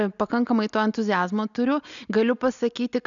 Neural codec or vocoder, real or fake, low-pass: none; real; 7.2 kHz